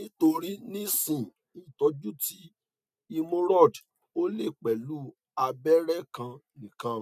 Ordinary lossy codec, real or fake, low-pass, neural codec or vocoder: none; real; 14.4 kHz; none